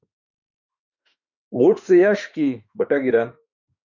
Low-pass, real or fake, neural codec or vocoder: 7.2 kHz; fake; autoencoder, 48 kHz, 32 numbers a frame, DAC-VAE, trained on Japanese speech